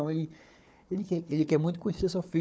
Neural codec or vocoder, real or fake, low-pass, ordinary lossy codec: codec, 16 kHz, 4 kbps, FunCodec, trained on Chinese and English, 50 frames a second; fake; none; none